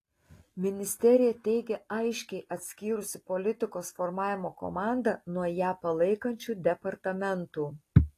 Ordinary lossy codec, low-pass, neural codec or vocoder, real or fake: AAC, 48 kbps; 14.4 kHz; none; real